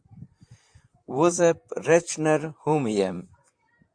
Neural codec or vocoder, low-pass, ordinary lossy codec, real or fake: vocoder, 44.1 kHz, 128 mel bands, Pupu-Vocoder; 9.9 kHz; AAC, 64 kbps; fake